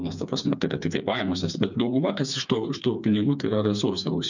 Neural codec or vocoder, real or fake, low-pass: codec, 16 kHz, 4 kbps, FreqCodec, smaller model; fake; 7.2 kHz